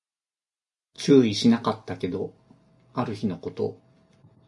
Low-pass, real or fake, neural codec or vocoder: 10.8 kHz; real; none